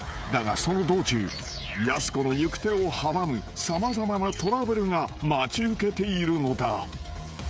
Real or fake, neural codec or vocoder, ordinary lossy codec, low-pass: fake; codec, 16 kHz, 16 kbps, FreqCodec, smaller model; none; none